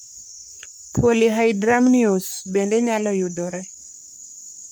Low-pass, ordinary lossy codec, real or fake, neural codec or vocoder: none; none; fake; codec, 44.1 kHz, 3.4 kbps, Pupu-Codec